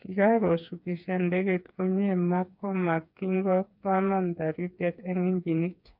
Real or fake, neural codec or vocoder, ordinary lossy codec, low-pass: fake; codec, 16 kHz, 4 kbps, FreqCodec, smaller model; none; 5.4 kHz